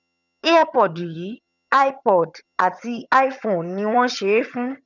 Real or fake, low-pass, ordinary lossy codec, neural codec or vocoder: fake; 7.2 kHz; none; vocoder, 22.05 kHz, 80 mel bands, HiFi-GAN